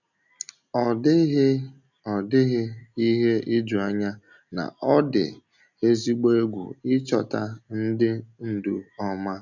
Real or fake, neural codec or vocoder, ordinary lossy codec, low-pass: real; none; none; 7.2 kHz